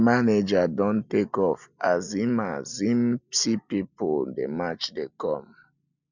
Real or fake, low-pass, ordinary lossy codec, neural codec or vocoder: real; 7.2 kHz; none; none